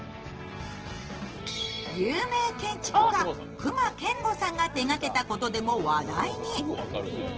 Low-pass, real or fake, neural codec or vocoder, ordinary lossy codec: 7.2 kHz; real; none; Opus, 16 kbps